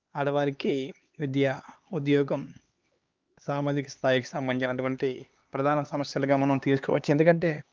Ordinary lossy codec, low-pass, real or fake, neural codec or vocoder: Opus, 24 kbps; 7.2 kHz; fake; codec, 16 kHz, 2 kbps, X-Codec, HuBERT features, trained on LibriSpeech